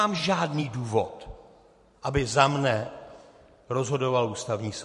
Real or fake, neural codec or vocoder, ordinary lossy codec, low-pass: fake; vocoder, 44.1 kHz, 128 mel bands every 512 samples, BigVGAN v2; MP3, 48 kbps; 14.4 kHz